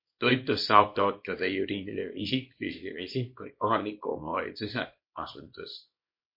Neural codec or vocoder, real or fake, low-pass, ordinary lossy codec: codec, 24 kHz, 0.9 kbps, WavTokenizer, small release; fake; 5.4 kHz; MP3, 32 kbps